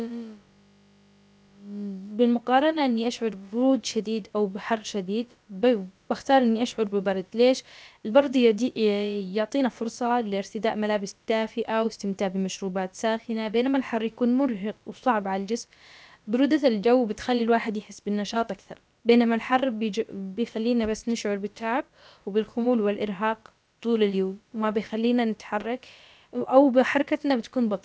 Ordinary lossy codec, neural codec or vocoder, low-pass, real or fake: none; codec, 16 kHz, about 1 kbps, DyCAST, with the encoder's durations; none; fake